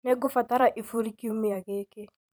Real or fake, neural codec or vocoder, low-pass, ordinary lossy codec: fake; vocoder, 44.1 kHz, 128 mel bands every 512 samples, BigVGAN v2; none; none